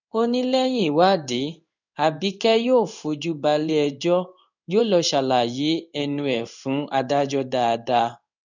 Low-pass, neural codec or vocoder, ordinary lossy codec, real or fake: 7.2 kHz; codec, 16 kHz in and 24 kHz out, 1 kbps, XY-Tokenizer; none; fake